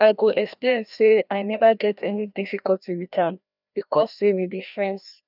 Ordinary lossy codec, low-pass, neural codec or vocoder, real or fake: none; 5.4 kHz; codec, 16 kHz, 1 kbps, FreqCodec, larger model; fake